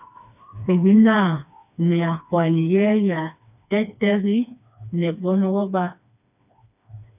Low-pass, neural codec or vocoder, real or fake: 3.6 kHz; codec, 16 kHz, 2 kbps, FreqCodec, smaller model; fake